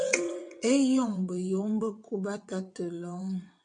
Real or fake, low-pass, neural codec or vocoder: fake; 9.9 kHz; vocoder, 22.05 kHz, 80 mel bands, WaveNeXt